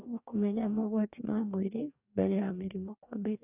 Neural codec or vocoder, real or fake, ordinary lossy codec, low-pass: codec, 44.1 kHz, 2.6 kbps, DAC; fake; none; 3.6 kHz